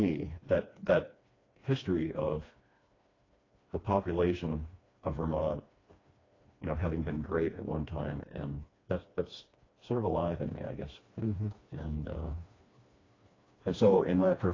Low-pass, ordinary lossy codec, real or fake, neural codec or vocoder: 7.2 kHz; AAC, 32 kbps; fake; codec, 16 kHz, 2 kbps, FreqCodec, smaller model